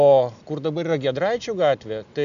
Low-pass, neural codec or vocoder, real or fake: 7.2 kHz; none; real